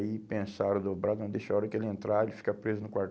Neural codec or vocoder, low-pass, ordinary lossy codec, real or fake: none; none; none; real